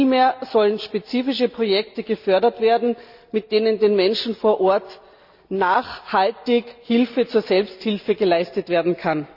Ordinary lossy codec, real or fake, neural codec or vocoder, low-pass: Opus, 64 kbps; real; none; 5.4 kHz